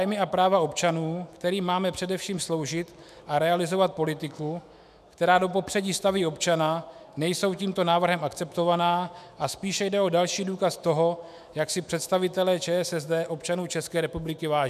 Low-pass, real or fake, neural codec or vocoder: 14.4 kHz; fake; autoencoder, 48 kHz, 128 numbers a frame, DAC-VAE, trained on Japanese speech